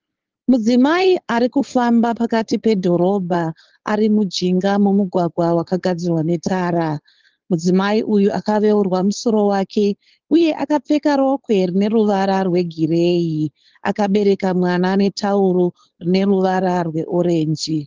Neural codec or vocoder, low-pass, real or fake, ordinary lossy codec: codec, 16 kHz, 4.8 kbps, FACodec; 7.2 kHz; fake; Opus, 16 kbps